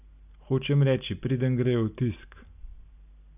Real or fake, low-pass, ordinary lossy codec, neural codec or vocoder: real; 3.6 kHz; none; none